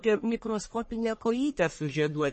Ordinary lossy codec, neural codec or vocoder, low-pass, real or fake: MP3, 32 kbps; codec, 44.1 kHz, 1.7 kbps, Pupu-Codec; 10.8 kHz; fake